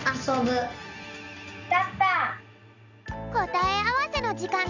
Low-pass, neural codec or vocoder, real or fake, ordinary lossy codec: 7.2 kHz; none; real; none